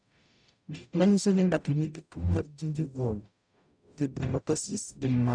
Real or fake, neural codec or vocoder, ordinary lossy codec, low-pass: fake; codec, 44.1 kHz, 0.9 kbps, DAC; none; 9.9 kHz